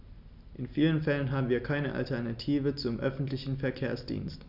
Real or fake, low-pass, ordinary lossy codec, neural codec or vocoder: real; 5.4 kHz; none; none